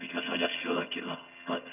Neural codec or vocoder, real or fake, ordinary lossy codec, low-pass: vocoder, 22.05 kHz, 80 mel bands, HiFi-GAN; fake; none; 3.6 kHz